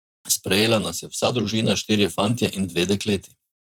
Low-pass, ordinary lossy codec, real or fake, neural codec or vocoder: 19.8 kHz; none; fake; vocoder, 44.1 kHz, 128 mel bands, Pupu-Vocoder